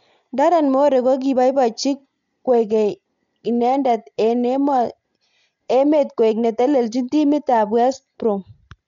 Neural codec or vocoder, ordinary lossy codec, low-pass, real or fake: none; none; 7.2 kHz; real